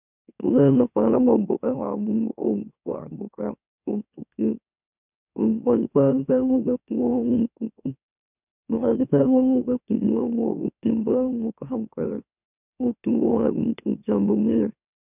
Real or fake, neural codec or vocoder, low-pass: fake; autoencoder, 44.1 kHz, a latent of 192 numbers a frame, MeloTTS; 3.6 kHz